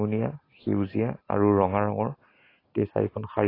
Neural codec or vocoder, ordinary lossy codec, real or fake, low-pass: none; AAC, 24 kbps; real; 5.4 kHz